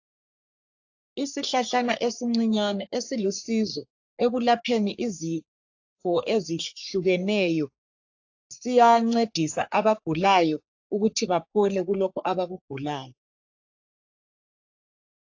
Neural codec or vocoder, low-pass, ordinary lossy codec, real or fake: codec, 44.1 kHz, 3.4 kbps, Pupu-Codec; 7.2 kHz; AAC, 48 kbps; fake